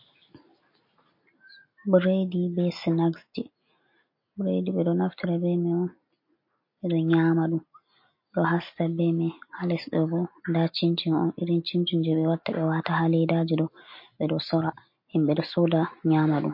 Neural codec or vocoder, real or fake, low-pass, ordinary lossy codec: none; real; 5.4 kHz; MP3, 32 kbps